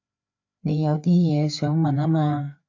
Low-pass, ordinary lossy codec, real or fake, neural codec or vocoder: 7.2 kHz; AAC, 48 kbps; fake; codec, 16 kHz, 4 kbps, FreqCodec, larger model